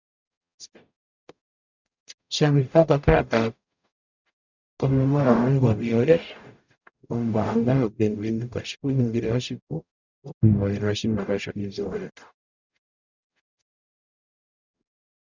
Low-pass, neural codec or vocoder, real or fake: 7.2 kHz; codec, 44.1 kHz, 0.9 kbps, DAC; fake